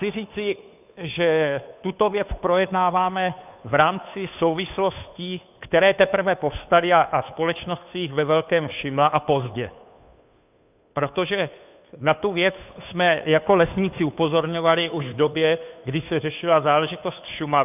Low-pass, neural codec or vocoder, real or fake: 3.6 kHz; codec, 16 kHz, 2 kbps, FunCodec, trained on Chinese and English, 25 frames a second; fake